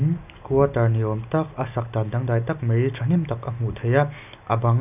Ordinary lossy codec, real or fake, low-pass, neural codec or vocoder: none; real; 3.6 kHz; none